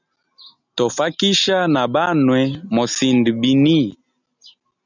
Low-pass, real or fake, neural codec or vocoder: 7.2 kHz; real; none